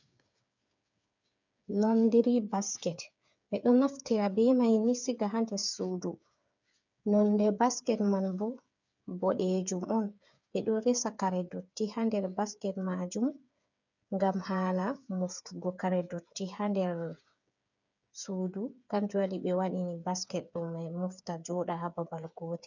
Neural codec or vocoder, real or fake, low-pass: codec, 16 kHz, 8 kbps, FreqCodec, smaller model; fake; 7.2 kHz